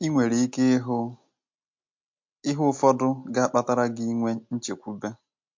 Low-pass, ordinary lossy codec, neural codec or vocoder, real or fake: 7.2 kHz; MP3, 48 kbps; none; real